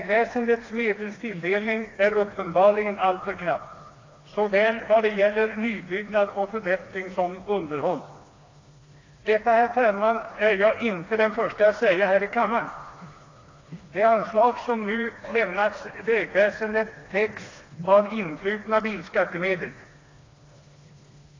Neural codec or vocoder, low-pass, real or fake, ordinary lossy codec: codec, 16 kHz, 2 kbps, FreqCodec, smaller model; 7.2 kHz; fake; AAC, 32 kbps